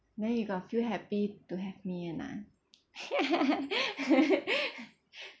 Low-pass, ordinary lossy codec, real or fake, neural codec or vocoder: none; none; real; none